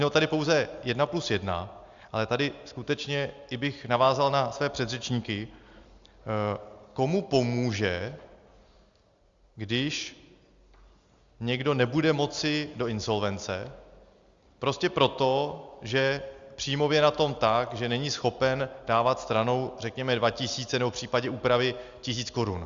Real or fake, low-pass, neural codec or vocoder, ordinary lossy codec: real; 7.2 kHz; none; Opus, 64 kbps